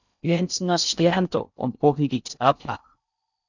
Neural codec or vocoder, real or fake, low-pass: codec, 16 kHz in and 24 kHz out, 0.6 kbps, FocalCodec, streaming, 2048 codes; fake; 7.2 kHz